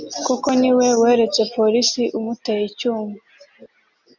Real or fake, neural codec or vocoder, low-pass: real; none; 7.2 kHz